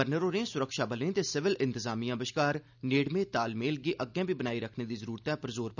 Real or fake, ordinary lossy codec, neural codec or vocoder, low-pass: real; none; none; 7.2 kHz